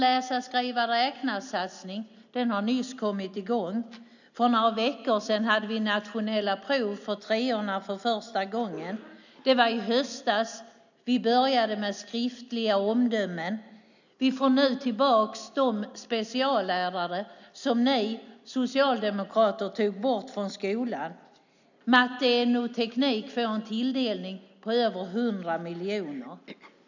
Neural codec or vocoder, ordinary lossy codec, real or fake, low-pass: none; none; real; 7.2 kHz